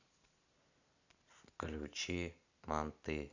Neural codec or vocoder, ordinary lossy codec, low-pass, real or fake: none; none; 7.2 kHz; real